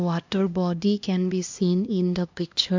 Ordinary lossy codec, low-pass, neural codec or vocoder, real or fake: MP3, 64 kbps; 7.2 kHz; codec, 16 kHz, 1 kbps, X-Codec, HuBERT features, trained on LibriSpeech; fake